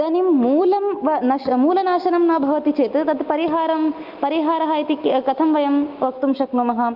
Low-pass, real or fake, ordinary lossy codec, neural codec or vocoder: 5.4 kHz; real; Opus, 32 kbps; none